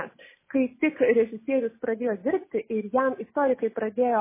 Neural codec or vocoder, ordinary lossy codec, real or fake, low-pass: none; MP3, 16 kbps; real; 3.6 kHz